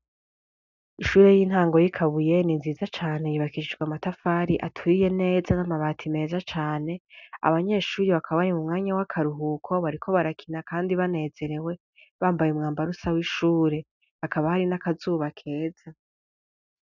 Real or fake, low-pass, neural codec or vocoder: real; 7.2 kHz; none